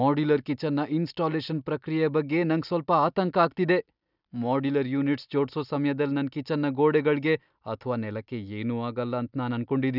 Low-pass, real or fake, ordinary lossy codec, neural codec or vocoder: 5.4 kHz; real; none; none